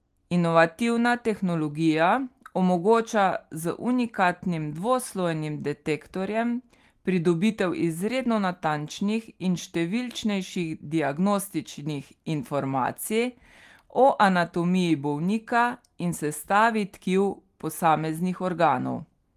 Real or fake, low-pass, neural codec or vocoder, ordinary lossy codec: real; 14.4 kHz; none; Opus, 24 kbps